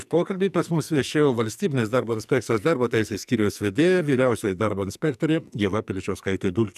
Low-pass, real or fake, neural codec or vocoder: 14.4 kHz; fake; codec, 44.1 kHz, 2.6 kbps, SNAC